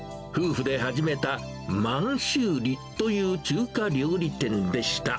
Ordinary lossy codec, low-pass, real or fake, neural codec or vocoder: none; none; real; none